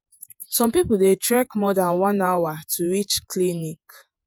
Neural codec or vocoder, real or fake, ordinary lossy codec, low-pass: vocoder, 48 kHz, 128 mel bands, Vocos; fake; none; none